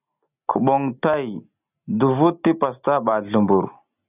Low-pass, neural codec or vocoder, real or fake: 3.6 kHz; none; real